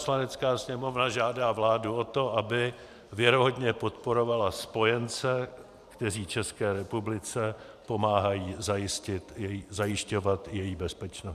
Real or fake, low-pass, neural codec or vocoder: fake; 14.4 kHz; vocoder, 44.1 kHz, 128 mel bands every 512 samples, BigVGAN v2